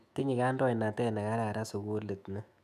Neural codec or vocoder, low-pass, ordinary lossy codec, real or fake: autoencoder, 48 kHz, 128 numbers a frame, DAC-VAE, trained on Japanese speech; 14.4 kHz; none; fake